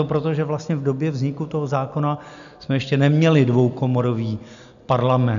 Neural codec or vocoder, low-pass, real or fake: none; 7.2 kHz; real